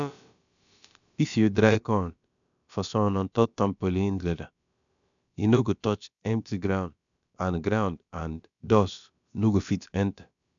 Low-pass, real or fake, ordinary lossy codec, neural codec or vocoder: 7.2 kHz; fake; none; codec, 16 kHz, about 1 kbps, DyCAST, with the encoder's durations